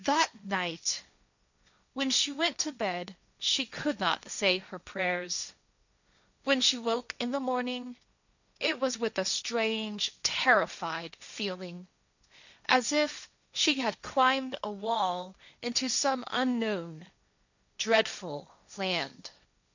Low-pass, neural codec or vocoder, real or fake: 7.2 kHz; codec, 16 kHz, 1.1 kbps, Voila-Tokenizer; fake